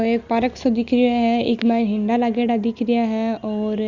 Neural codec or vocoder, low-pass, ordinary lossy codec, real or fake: none; 7.2 kHz; Opus, 64 kbps; real